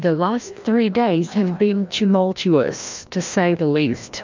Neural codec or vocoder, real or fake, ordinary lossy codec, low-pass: codec, 16 kHz, 1 kbps, FreqCodec, larger model; fake; MP3, 64 kbps; 7.2 kHz